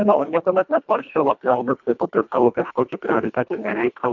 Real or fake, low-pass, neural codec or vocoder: fake; 7.2 kHz; codec, 24 kHz, 1.5 kbps, HILCodec